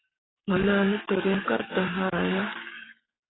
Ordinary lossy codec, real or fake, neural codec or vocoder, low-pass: AAC, 16 kbps; fake; codec, 44.1 kHz, 7.8 kbps, DAC; 7.2 kHz